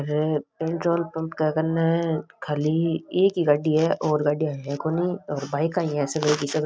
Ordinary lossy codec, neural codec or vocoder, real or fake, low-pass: none; none; real; none